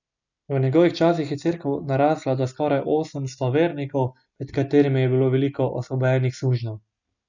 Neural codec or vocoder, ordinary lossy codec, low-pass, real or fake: none; none; 7.2 kHz; real